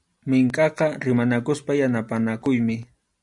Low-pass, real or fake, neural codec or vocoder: 10.8 kHz; real; none